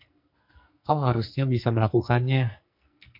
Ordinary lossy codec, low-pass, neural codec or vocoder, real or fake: MP3, 48 kbps; 5.4 kHz; codec, 44.1 kHz, 2.6 kbps, SNAC; fake